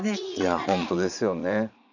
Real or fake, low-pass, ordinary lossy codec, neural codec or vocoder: fake; 7.2 kHz; none; vocoder, 22.05 kHz, 80 mel bands, Vocos